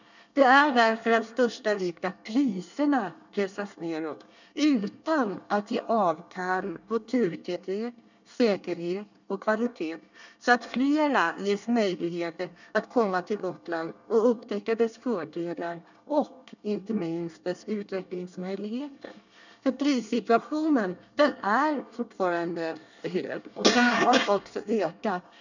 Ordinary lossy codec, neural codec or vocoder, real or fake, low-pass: none; codec, 24 kHz, 1 kbps, SNAC; fake; 7.2 kHz